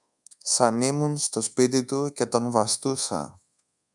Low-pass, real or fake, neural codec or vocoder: 10.8 kHz; fake; codec, 24 kHz, 1.2 kbps, DualCodec